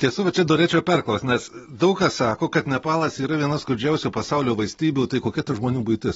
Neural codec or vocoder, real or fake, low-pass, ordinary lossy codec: none; real; 19.8 kHz; AAC, 24 kbps